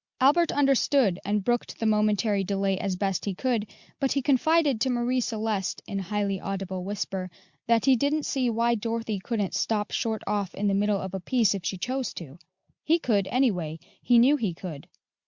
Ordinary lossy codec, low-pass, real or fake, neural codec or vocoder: Opus, 64 kbps; 7.2 kHz; real; none